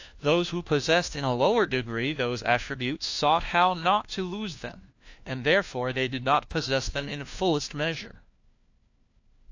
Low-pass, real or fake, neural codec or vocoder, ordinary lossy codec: 7.2 kHz; fake; codec, 16 kHz, 1 kbps, FunCodec, trained on LibriTTS, 50 frames a second; AAC, 48 kbps